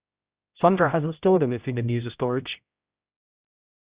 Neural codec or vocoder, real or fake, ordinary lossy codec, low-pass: codec, 16 kHz, 0.5 kbps, X-Codec, HuBERT features, trained on general audio; fake; Opus, 64 kbps; 3.6 kHz